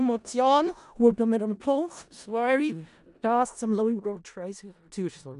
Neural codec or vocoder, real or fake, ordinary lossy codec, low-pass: codec, 16 kHz in and 24 kHz out, 0.4 kbps, LongCat-Audio-Codec, four codebook decoder; fake; none; 10.8 kHz